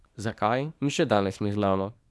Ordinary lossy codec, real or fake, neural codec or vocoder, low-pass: none; fake; codec, 24 kHz, 0.9 kbps, WavTokenizer, small release; none